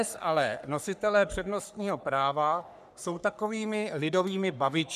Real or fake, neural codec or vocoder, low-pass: fake; codec, 44.1 kHz, 3.4 kbps, Pupu-Codec; 14.4 kHz